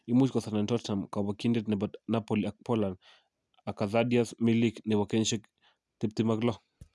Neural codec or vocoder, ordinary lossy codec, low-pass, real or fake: none; none; none; real